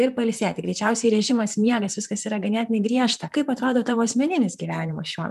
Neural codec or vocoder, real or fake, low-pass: vocoder, 44.1 kHz, 128 mel bands, Pupu-Vocoder; fake; 14.4 kHz